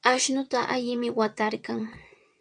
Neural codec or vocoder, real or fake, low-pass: vocoder, 22.05 kHz, 80 mel bands, WaveNeXt; fake; 9.9 kHz